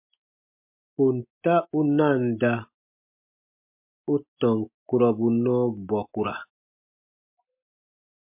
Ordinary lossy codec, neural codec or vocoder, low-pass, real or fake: MP3, 32 kbps; none; 3.6 kHz; real